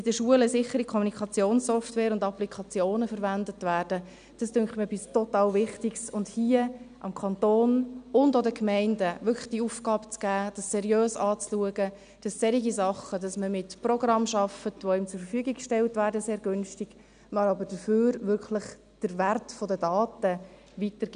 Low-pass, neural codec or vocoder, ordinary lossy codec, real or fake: 9.9 kHz; none; none; real